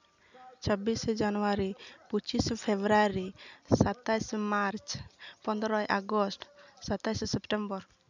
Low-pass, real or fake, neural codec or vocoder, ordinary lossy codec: 7.2 kHz; real; none; none